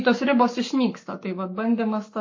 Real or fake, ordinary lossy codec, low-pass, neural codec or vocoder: fake; MP3, 32 kbps; 7.2 kHz; codec, 44.1 kHz, 7.8 kbps, Pupu-Codec